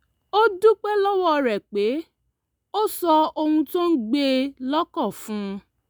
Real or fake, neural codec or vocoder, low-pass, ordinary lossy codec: real; none; none; none